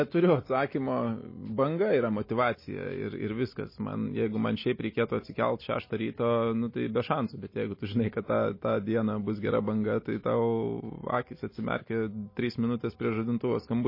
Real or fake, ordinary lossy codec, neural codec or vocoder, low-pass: real; MP3, 24 kbps; none; 5.4 kHz